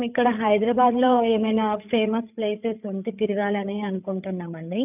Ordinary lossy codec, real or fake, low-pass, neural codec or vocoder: none; fake; 3.6 kHz; codec, 16 kHz, 8 kbps, FreqCodec, larger model